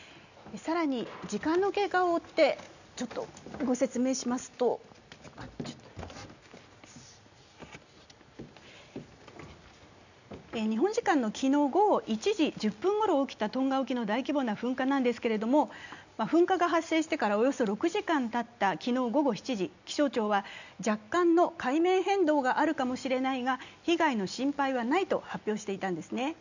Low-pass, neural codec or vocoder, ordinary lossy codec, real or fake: 7.2 kHz; none; none; real